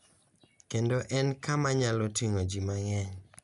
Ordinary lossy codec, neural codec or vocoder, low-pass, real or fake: AAC, 96 kbps; none; 10.8 kHz; real